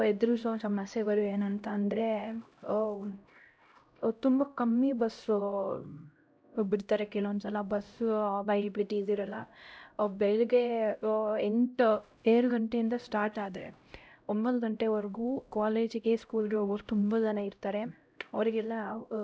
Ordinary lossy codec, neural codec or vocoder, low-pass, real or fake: none; codec, 16 kHz, 0.5 kbps, X-Codec, HuBERT features, trained on LibriSpeech; none; fake